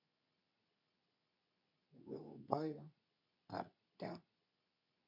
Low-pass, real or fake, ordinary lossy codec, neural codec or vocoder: 5.4 kHz; fake; none; codec, 24 kHz, 0.9 kbps, WavTokenizer, medium speech release version 2